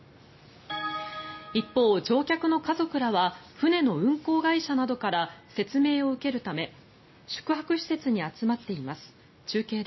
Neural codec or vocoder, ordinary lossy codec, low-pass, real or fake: none; MP3, 24 kbps; 7.2 kHz; real